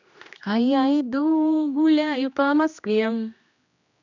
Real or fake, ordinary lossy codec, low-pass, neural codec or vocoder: fake; none; 7.2 kHz; codec, 16 kHz, 1 kbps, X-Codec, HuBERT features, trained on general audio